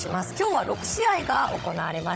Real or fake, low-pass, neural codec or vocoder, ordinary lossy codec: fake; none; codec, 16 kHz, 16 kbps, FunCodec, trained on Chinese and English, 50 frames a second; none